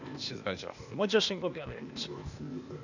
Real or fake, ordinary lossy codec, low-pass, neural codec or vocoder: fake; none; 7.2 kHz; codec, 16 kHz, 0.8 kbps, ZipCodec